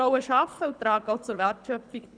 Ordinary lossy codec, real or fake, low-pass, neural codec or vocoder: none; fake; 9.9 kHz; codec, 24 kHz, 6 kbps, HILCodec